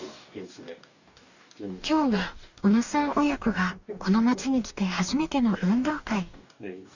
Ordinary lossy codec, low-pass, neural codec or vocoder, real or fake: none; 7.2 kHz; codec, 44.1 kHz, 2.6 kbps, DAC; fake